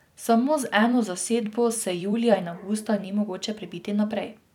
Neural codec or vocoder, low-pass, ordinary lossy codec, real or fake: vocoder, 44.1 kHz, 128 mel bands every 256 samples, BigVGAN v2; 19.8 kHz; none; fake